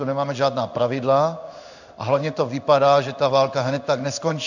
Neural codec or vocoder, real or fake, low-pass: codec, 16 kHz in and 24 kHz out, 1 kbps, XY-Tokenizer; fake; 7.2 kHz